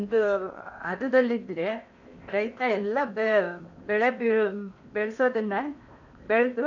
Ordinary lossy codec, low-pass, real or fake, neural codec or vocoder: none; 7.2 kHz; fake; codec, 16 kHz in and 24 kHz out, 0.8 kbps, FocalCodec, streaming, 65536 codes